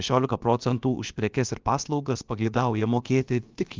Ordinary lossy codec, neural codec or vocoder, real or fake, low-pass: Opus, 32 kbps; codec, 16 kHz, about 1 kbps, DyCAST, with the encoder's durations; fake; 7.2 kHz